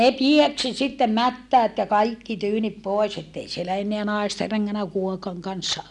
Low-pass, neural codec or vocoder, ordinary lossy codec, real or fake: none; none; none; real